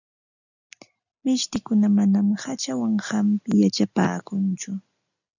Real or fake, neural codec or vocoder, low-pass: real; none; 7.2 kHz